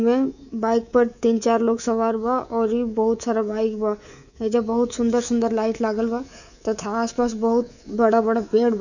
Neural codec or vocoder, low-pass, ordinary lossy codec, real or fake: codec, 24 kHz, 3.1 kbps, DualCodec; 7.2 kHz; none; fake